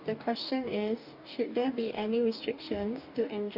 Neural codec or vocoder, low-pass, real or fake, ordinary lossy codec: codec, 44.1 kHz, 2.6 kbps, DAC; 5.4 kHz; fake; none